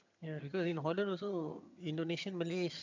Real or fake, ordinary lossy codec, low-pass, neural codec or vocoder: fake; MP3, 64 kbps; 7.2 kHz; vocoder, 22.05 kHz, 80 mel bands, HiFi-GAN